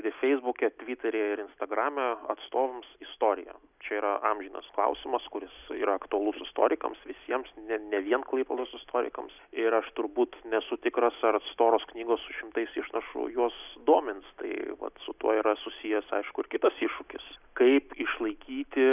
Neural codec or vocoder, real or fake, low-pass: none; real; 3.6 kHz